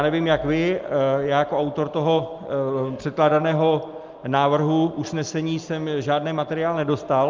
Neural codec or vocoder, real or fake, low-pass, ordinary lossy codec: none; real; 7.2 kHz; Opus, 24 kbps